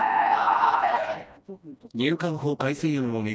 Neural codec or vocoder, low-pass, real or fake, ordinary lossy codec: codec, 16 kHz, 1 kbps, FreqCodec, smaller model; none; fake; none